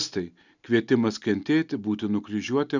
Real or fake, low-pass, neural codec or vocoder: real; 7.2 kHz; none